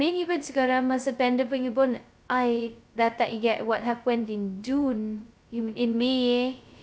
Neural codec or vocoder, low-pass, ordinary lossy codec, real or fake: codec, 16 kHz, 0.2 kbps, FocalCodec; none; none; fake